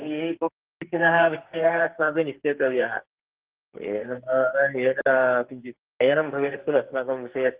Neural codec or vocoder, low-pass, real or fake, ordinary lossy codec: codec, 44.1 kHz, 2.6 kbps, SNAC; 3.6 kHz; fake; Opus, 16 kbps